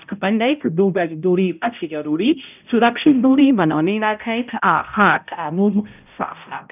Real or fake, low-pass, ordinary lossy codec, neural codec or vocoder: fake; 3.6 kHz; none; codec, 16 kHz, 0.5 kbps, X-Codec, HuBERT features, trained on balanced general audio